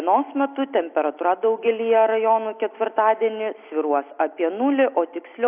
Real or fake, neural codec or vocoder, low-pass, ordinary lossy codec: real; none; 3.6 kHz; AAC, 32 kbps